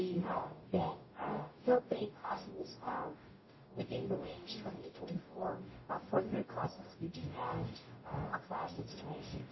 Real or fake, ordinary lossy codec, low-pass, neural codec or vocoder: fake; MP3, 24 kbps; 7.2 kHz; codec, 44.1 kHz, 0.9 kbps, DAC